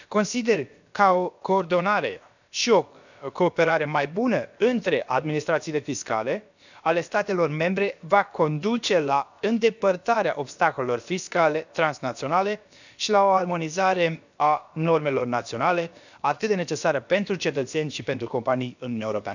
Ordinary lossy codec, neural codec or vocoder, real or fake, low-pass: none; codec, 16 kHz, about 1 kbps, DyCAST, with the encoder's durations; fake; 7.2 kHz